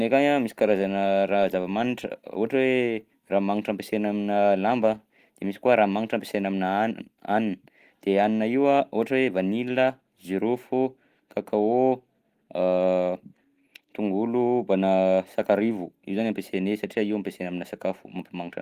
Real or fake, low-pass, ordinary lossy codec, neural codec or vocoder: real; 14.4 kHz; Opus, 24 kbps; none